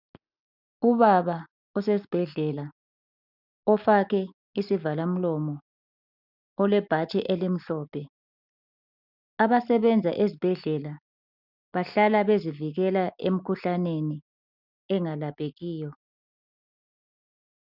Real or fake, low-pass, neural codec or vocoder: real; 5.4 kHz; none